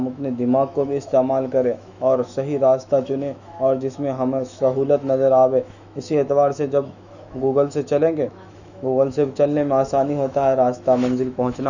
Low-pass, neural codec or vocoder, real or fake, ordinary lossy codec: 7.2 kHz; autoencoder, 48 kHz, 128 numbers a frame, DAC-VAE, trained on Japanese speech; fake; none